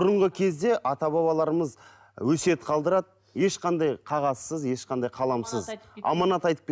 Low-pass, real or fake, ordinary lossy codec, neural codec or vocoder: none; real; none; none